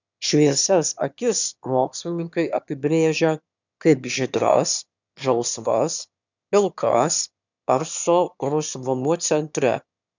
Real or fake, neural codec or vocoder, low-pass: fake; autoencoder, 22.05 kHz, a latent of 192 numbers a frame, VITS, trained on one speaker; 7.2 kHz